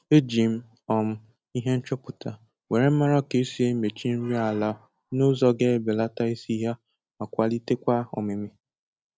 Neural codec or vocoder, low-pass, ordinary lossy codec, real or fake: none; none; none; real